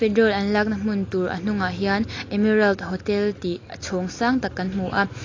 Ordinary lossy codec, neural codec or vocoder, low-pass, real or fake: AAC, 32 kbps; none; 7.2 kHz; real